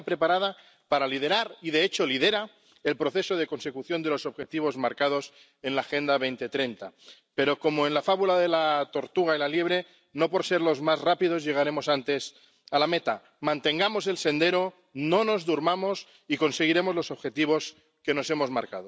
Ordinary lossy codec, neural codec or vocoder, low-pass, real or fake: none; none; none; real